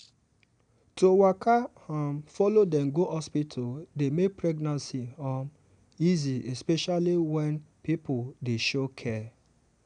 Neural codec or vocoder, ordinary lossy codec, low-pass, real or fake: none; none; 9.9 kHz; real